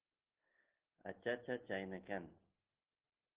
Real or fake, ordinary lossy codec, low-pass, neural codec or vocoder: real; Opus, 16 kbps; 3.6 kHz; none